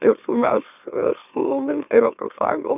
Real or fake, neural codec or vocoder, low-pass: fake; autoencoder, 44.1 kHz, a latent of 192 numbers a frame, MeloTTS; 3.6 kHz